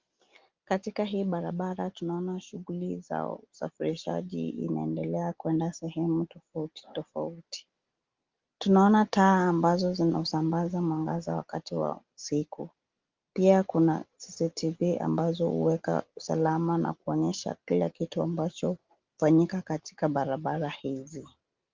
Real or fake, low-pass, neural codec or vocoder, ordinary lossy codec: real; 7.2 kHz; none; Opus, 32 kbps